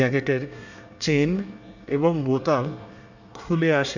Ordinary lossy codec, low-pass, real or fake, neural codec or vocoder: none; 7.2 kHz; fake; codec, 24 kHz, 1 kbps, SNAC